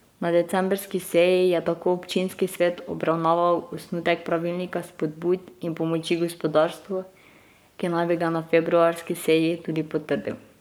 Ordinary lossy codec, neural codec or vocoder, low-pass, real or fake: none; codec, 44.1 kHz, 7.8 kbps, Pupu-Codec; none; fake